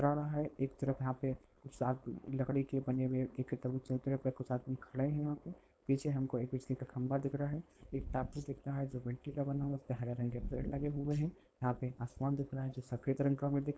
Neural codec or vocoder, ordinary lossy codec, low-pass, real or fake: codec, 16 kHz, 4.8 kbps, FACodec; none; none; fake